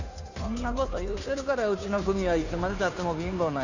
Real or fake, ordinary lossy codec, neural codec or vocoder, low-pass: fake; none; codec, 16 kHz, 2 kbps, FunCodec, trained on Chinese and English, 25 frames a second; 7.2 kHz